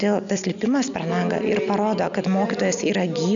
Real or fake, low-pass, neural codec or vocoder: real; 7.2 kHz; none